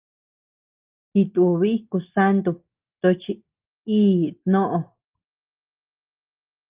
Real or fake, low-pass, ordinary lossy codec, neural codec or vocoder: real; 3.6 kHz; Opus, 32 kbps; none